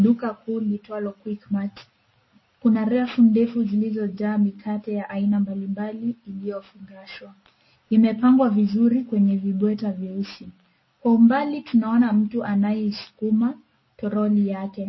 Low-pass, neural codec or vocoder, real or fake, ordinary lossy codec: 7.2 kHz; none; real; MP3, 24 kbps